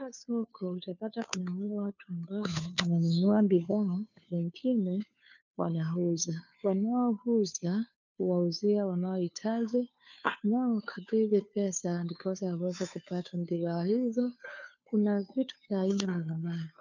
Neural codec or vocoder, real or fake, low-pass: codec, 16 kHz, 2 kbps, FunCodec, trained on Chinese and English, 25 frames a second; fake; 7.2 kHz